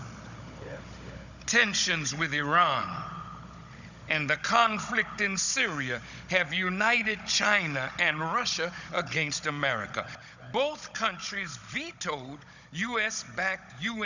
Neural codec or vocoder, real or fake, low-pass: codec, 16 kHz, 16 kbps, FunCodec, trained on Chinese and English, 50 frames a second; fake; 7.2 kHz